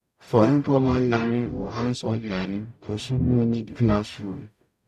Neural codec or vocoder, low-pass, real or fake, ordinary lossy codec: codec, 44.1 kHz, 0.9 kbps, DAC; 14.4 kHz; fake; none